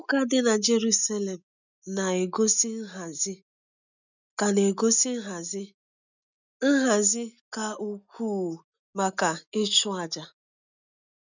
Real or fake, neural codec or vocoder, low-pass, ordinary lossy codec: real; none; 7.2 kHz; none